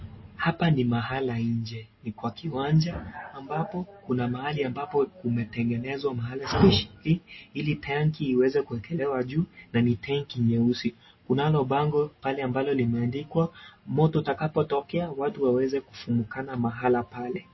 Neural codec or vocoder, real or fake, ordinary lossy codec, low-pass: none; real; MP3, 24 kbps; 7.2 kHz